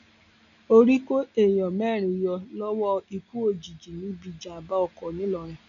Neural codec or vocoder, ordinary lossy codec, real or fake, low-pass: none; none; real; 7.2 kHz